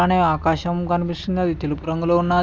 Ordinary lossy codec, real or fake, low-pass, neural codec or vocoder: none; real; none; none